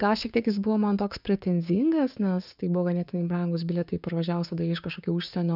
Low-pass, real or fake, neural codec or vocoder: 5.4 kHz; fake; codec, 16 kHz, 6 kbps, DAC